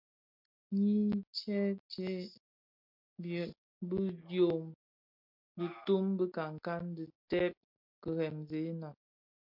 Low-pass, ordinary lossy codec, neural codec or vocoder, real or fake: 5.4 kHz; MP3, 48 kbps; none; real